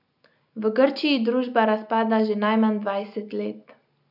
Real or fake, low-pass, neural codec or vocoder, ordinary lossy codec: real; 5.4 kHz; none; none